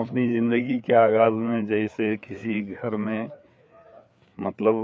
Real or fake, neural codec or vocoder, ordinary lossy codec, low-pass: fake; codec, 16 kHz, 4 kbps, FreqCodec, larger model; none; none